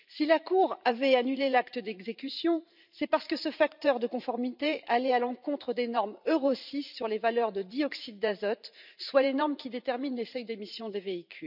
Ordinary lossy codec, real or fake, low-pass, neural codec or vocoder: none; fake; 5.4 kHz; vocoder, 44.1 kHz, 128 mel bands every 512 samples, BigVGAN v2